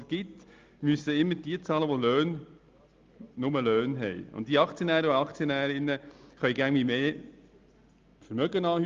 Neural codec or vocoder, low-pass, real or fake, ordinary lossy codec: none; 7.2 kHz; real; Opus, 32 kbps